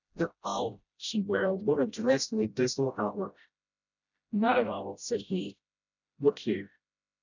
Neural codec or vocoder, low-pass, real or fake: codec, 16 kHz, 0.5 kbps, FreqCodec, smaller model; 7.2 kHz; fake